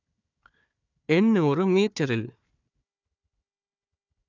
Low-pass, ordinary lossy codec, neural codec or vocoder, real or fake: 7.2 kHz; none; codec, 16 kHz, 4 kbps, FunCodec, trained on Chinese and English, 50 frames a second; fake